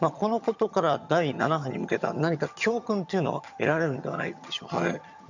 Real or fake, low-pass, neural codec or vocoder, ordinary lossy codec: fake; 7.2 kHz; vocoder, 22.05 kHz, 80 mel bands, HiFi-GAN; none